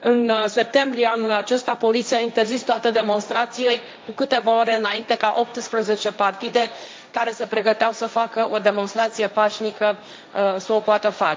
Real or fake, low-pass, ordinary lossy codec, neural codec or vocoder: fake; none; none; codec, 16 kHz, 1.1 kbps, Voila-Tokenizer